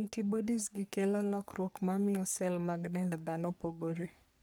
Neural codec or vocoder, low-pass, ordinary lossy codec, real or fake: codec, 44.1 kHz, 3.4 kbps, Pupu-Codec; none; none; fake